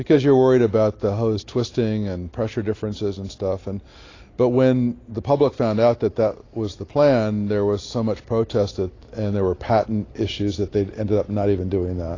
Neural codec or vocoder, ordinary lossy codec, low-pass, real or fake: none; AAC, 32 kbps; 7.2 kHz; real